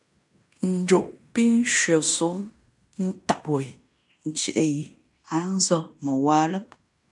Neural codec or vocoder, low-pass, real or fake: codec, 16 kHz in and 24 kHz out, 0.9 kbps, LongCat-Audio-Codec, fine tuned four codebook decoder; 10.8 kHz; fake